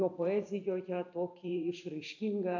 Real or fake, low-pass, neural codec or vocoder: fake; 7.2 kHz; vocoder, 44.1 kHz, 128 mel bands every 512 samples, BigVGAN v2